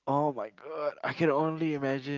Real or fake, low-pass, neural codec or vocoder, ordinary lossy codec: real; 7.2 kHz; none; Opus, 32 kbps